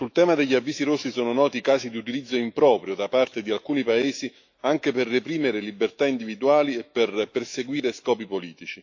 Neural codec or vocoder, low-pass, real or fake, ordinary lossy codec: autoencoder, 48 kHz, 128 numbers a frame, DAC-VAE, trained on Japanese speech; 7.2 kHz; fake; AAC, 48 kbps